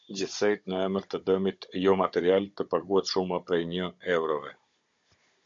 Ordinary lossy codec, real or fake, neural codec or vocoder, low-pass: MP3, 48 kbps; fake; codec, 16 kHz, 16 kbps, FunCodec, trained on Chinese and English, 50 frames a second; 7.2 kHz